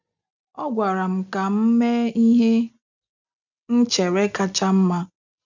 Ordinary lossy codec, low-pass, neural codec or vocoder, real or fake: none; 7.2 kHz; none; real